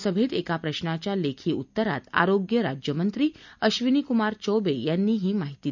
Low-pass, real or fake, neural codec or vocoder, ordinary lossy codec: 7.2 kHz; real; none; none